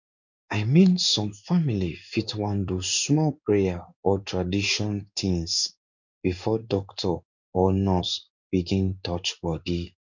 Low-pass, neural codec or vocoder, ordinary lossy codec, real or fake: 7.2 kHz; codec, 16 kHz in and 24 kHz out, 1 kbps, XY-Tokenizer; none; fake